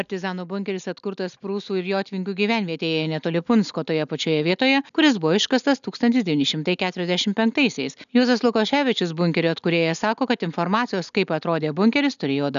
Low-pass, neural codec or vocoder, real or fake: 7.2 kHz; none; real